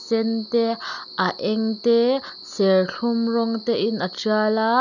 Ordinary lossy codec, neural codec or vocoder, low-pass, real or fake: none; none; 7.2 kHz; real